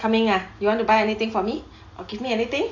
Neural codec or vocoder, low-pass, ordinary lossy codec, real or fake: none; 7.2 kHz; AAC, 48 kbps; real